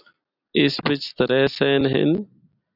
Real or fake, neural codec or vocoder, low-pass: real; none; 5.4 kHz